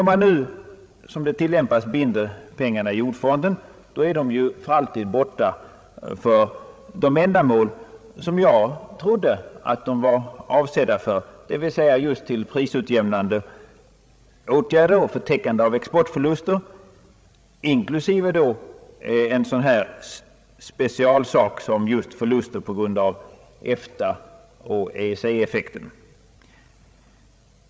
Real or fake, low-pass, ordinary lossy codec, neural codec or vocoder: fake; none; none; codec, 16 kHz, 16 kbps, FreqCodec, larger model